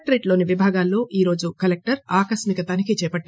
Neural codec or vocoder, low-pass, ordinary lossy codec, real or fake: none; none; none; real